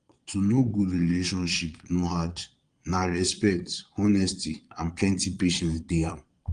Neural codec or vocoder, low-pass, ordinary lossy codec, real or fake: vocoder, 22.05 kHz, 80 mel bands, Vocos; 9.9 kHz; Opus, 24 kbps; fake